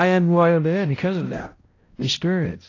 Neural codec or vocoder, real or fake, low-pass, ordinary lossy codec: codec, 16 kHz, 0.5 kbps, X-Codec, HuBERT features, trained on balanced general audio; fake; 7.2 kHz; AAC, 32 kbps